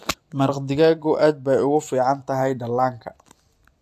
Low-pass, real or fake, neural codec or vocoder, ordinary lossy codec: 14.4 kHz; fake; vocoder, 44.1 kHz, 128 mel bands every 256 samples, BigVGAN v2; AAC, 64 kbps